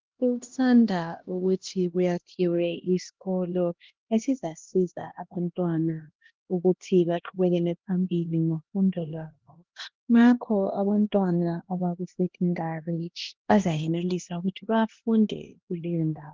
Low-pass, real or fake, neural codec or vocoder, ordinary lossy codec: 7.2 kHz; fake; codec, 16 kHz, 1 kbps, X-Codec, HuBERT features, trained on LibriSpeech; Opus, 16 kbps